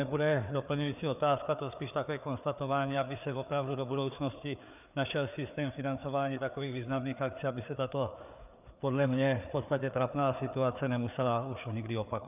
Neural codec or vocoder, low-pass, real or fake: codec, 16 kHz, 4 kbps, FreqCodec, larger model; 3.6 kHz; fake